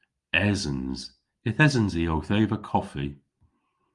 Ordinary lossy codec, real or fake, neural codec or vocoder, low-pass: Opus, 32 kbps; real; none; 10.8 kHz